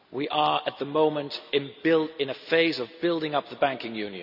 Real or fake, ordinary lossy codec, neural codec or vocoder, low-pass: real; none; none; 5.4 kHz